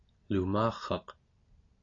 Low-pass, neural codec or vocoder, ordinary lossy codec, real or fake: 7.2 kHz; none; AAC, 32 kbps; real